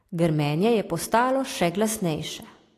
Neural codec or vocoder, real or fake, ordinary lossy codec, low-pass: none; real; AAC, 48 kbps; 14.4 kHz